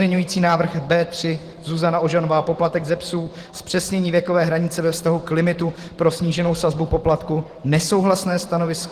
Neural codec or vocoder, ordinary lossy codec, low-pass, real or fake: vocoder, 44.1 kHz, 128 mel bands every 512 samples, BigVGAN v2; Opus, 16 kbps; 14.4 kHz; fake